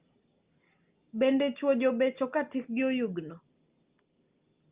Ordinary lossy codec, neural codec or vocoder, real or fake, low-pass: Opus, 24 kbps; none; real; 3.6 kHz